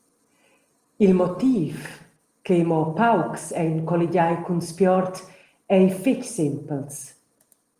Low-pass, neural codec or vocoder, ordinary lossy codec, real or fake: 14.4 kHz; none; Opus, 24 kbps; real